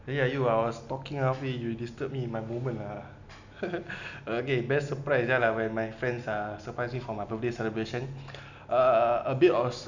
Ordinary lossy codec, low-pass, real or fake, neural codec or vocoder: none; 7.2 kHz; real; none